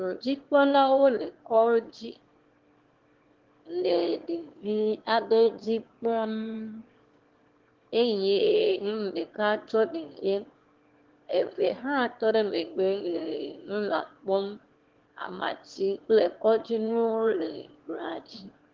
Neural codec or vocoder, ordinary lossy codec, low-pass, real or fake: autoencoder, 22.05 kHz, a latent of 192 numbers a frame, VITS, trained on one speaker; Opus, 16 kbps; 7.2 kHz; fake